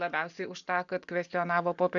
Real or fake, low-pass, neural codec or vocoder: real; 7.2 kHz; none